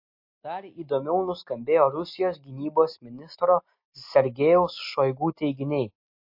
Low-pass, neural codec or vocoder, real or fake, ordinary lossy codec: 5.4 kHz; none; real; MP3, 32 kbps